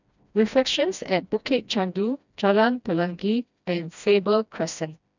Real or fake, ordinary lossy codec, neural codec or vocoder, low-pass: fake; none; codec, 16 kHz, 1 kbps, FreqCodec, smaller model; 7.2 kHz